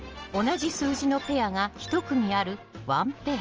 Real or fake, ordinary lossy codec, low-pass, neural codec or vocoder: real; Opus, 24 kbps; 7.2 kHz; none